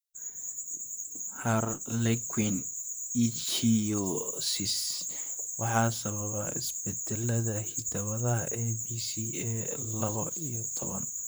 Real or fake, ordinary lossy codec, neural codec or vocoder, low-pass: fake; none; vocoder, 44.1 kHz, 128 mel bands, Pupu-Vocoder; none